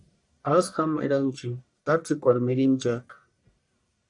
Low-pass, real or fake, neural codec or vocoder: 10.8 kHz; fake; codec, 44.1 kHz, 1.7 kbps, Pupu-Codec